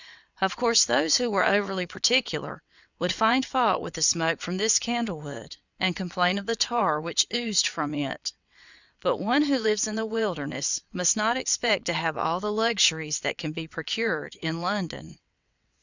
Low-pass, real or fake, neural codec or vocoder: 7.2 kHz; fake; vocoder, 22.05 kHz, 80 mel bands, WaveNeXt